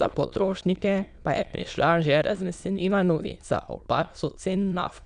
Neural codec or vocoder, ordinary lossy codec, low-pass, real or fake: autoencoder, 22.05 kHz, a latent of 192 numbers a frame, VITS, trained on many speakers; none; 9.9 kHz; fake